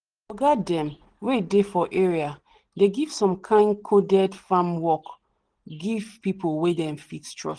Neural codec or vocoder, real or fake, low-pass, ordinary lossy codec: none; real; 9.9 kHz; Opus, 16 kbps